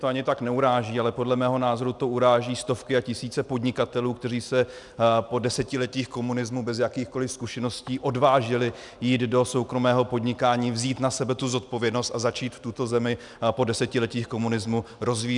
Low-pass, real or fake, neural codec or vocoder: 10.8 kHz; real; none